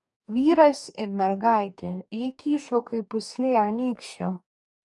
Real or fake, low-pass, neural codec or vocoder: fake; 10.8 kHz; codec, 44.1 kHz, 2.6 kbps, DAC